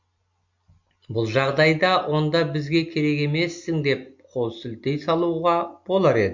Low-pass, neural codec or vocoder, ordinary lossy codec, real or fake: 7.2 kHz; none; MP3, 48 kbps; real